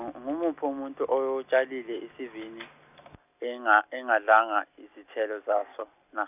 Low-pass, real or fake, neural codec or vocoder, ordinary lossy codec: 3.6 kHz; real; none; none